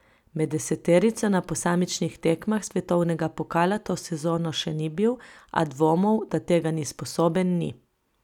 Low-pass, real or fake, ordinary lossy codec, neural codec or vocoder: 19.8 kHz; real; none; none